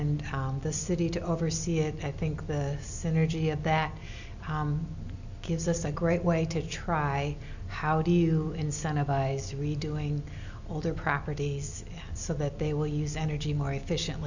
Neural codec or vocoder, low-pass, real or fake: none; 7.2 kHz; real